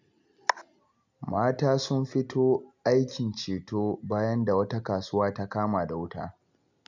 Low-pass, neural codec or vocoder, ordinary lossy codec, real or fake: 7.2 kHz; none; none; real